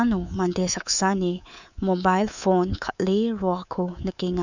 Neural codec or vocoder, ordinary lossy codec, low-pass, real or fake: codec, 24 kHz, 3.1 kbps, DualCodec; none; 7.2 kHz; fake